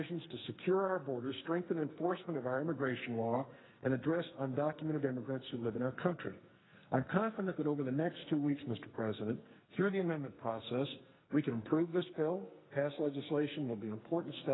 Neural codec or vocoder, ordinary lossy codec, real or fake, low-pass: codec, 44.1 kHz, 2.6 kbps, SNAC; AAC, 16 kbps; fake; 7.2 kHz